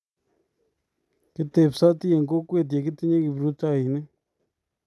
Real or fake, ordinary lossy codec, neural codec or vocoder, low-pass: real; none; none; none